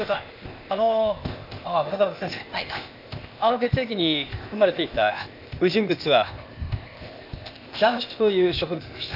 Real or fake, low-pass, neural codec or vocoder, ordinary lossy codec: fake; 5.4 kHz; codec, 16 kHz, 0.8 kbps, ZipCodec; MP3, 48 kbps